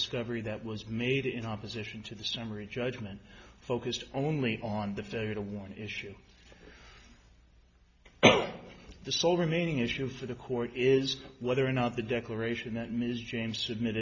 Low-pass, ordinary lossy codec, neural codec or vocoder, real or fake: 7.2 kHz; AAC, 48 kbps; none; real